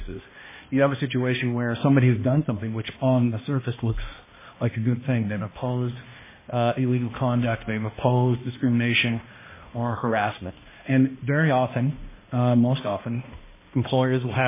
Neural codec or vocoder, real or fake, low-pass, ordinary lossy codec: codec, 16 kHz, 1 kbps, X-Codec, HuBERT features, trained on balanced general audio; fake; 3.6 kHz; MP3, 16 kbps